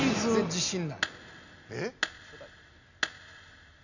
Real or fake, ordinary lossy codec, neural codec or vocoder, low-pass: real; none; none; 7.2 kHz